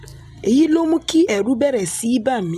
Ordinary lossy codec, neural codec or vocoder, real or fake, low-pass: none; none; real; 14.4 kHz